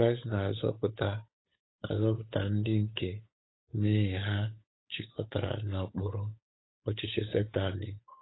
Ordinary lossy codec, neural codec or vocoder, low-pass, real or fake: AAC, 16 kbps; codec, 16 kHz, 8 kbps, FunCodec, trained on Chinese and English, 25 frames a second; 7.2 kHz; fake